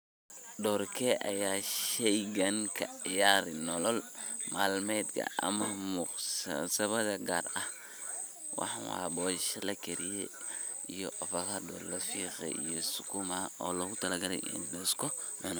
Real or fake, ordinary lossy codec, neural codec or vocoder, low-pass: real; none; none; none